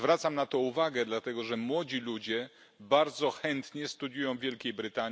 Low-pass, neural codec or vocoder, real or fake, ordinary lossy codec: none; none; real; none